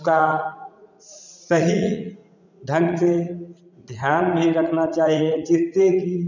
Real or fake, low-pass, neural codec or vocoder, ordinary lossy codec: fake; 7.2 kHz; vocoder, 44.1 kHz, 80 mel bands, Vocos; none